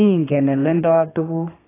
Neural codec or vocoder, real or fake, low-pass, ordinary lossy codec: codec, 32 kHz, 1.9 kbps, SNAC; fake; 3.6 kHz; AAC, 16 kbps